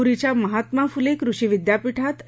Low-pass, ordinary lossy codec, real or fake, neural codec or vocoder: none; none; real; none